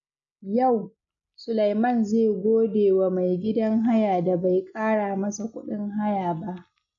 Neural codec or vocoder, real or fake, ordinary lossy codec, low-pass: none; real; AAC, 64 kbps; 7.2 kHz